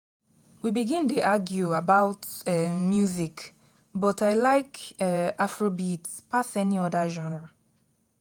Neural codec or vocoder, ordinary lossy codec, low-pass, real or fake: vocoder, 48 kHz, 128 mel bands, Vocos; none; none; fake